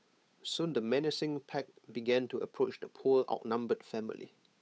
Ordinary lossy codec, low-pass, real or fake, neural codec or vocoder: none; none; fake; codec, 16 kHz, 8 kbps, FunCodec, trained on Chinese and English, 25 frames a second